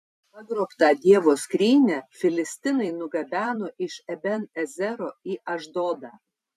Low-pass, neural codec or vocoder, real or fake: 14.4 kHz; vocoder, 44.1 kHz, 128 mel bands every 256 samples, BigVGAN v2; fake